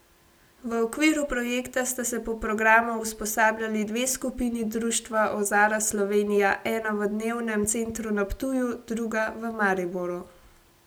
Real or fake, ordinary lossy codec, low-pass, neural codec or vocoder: real; none; none; none